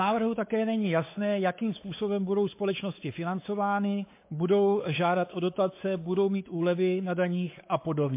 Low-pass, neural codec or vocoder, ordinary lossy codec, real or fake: 3.6 kHz; codec, 16 kHz, 4 kbps, X-Codec, WavLM features, trained on Multilingual LibriSpeech; MP3, 24 kbps; fake